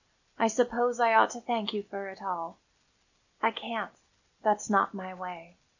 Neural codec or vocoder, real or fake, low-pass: none; real; 7.2 kHz